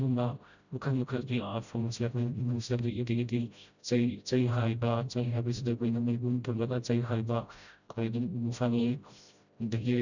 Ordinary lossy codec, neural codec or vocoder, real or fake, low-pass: none; codec, 16 kHz, 0.5 kbps, FreqCodec, smaller model; fake; 7.2 kHz